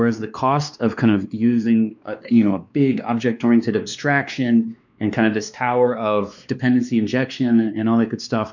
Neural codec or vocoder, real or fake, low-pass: codec, 16 kHz, 2 kbps, X-Codec, WavLM features, trained on Multilingual LibriSpeech; fake; 7.2 kHz